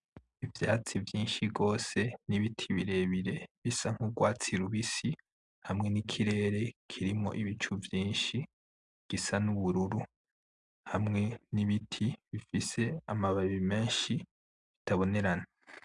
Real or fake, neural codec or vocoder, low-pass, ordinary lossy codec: real; none; 10.8 kHz; MP3, 96 kbps